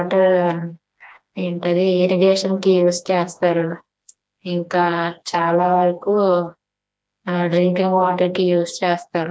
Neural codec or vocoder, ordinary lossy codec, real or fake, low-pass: codec, 16 kHz, 2 kbps, FreqCodec, smaller model; none; fake; none